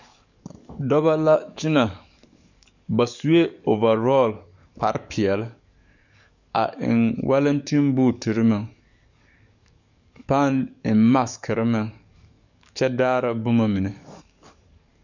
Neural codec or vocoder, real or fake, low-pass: codec, 44.1 kHz, 7.8 kbps, DAC; fake; 7.2 kHz